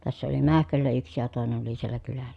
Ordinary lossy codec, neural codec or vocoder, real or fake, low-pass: none; none; real; none